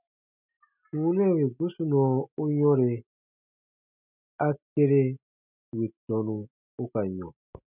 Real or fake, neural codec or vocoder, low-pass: real; none; 3.6 kHz